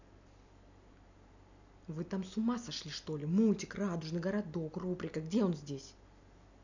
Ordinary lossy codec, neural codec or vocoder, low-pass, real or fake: none; none; 7.2 kHz; real